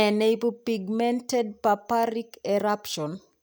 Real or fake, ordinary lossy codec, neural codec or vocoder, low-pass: real; none; none; none